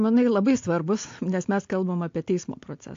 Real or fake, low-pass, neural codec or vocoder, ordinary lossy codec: real; 7.2 kHz; none; AAC, 48 kbps